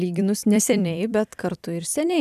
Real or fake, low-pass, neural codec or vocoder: fake; 14.4 kHz; vocoder, 44.1 kHz, 128 mel bands every 256 samples, BigVGAN v2